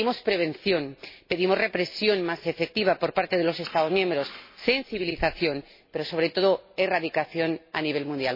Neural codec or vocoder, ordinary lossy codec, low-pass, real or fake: none; MP3, 24 kbps; 5.4 kHz; real